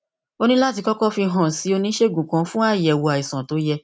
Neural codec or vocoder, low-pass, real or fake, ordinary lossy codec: none; none; real; none